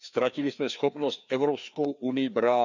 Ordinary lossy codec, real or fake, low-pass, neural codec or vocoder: none; fake; 7.2 kHz; codec, 16 kHz, 2 kbps, FreqCodec, larger model